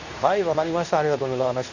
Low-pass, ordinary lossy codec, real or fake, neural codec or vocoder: 7.2 kHz; none; fake; codec, 24 kHz, 0.9 kbps, WavTokenizer, medium speech release version 2